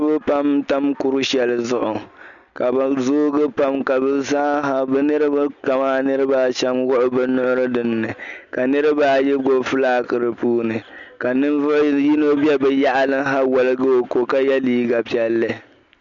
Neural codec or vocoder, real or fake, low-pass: none; real; 7.2 kHz